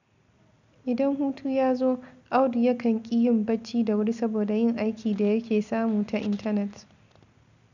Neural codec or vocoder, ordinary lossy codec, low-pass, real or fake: none; none; 7.2 kHz; real